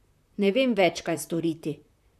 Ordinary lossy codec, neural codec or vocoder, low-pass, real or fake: none; vocoder, 44.1 kHz, 128 mel bands, Pupu-Vocoder; 14.4 kHz; fake